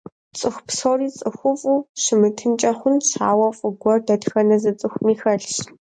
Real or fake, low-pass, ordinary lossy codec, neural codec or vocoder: real; 9.9 kHz; MP3, 64 kbps; none